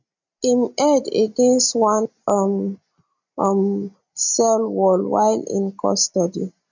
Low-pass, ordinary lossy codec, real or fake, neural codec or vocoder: 7.2 kHz; none; real; none